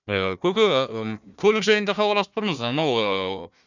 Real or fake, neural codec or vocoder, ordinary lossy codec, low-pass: fake; codec, 16 kHz, 1 kbps, FunCodec, trained on Chinese and English, 50 frames a second; none; 7.2 kHz